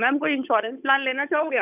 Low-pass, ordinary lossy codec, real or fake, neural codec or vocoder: 3.6 kHz; none; fake; codec, 16 kHz, 8 kbps, FunCodec, trained on Chinese and English, 25 frames a second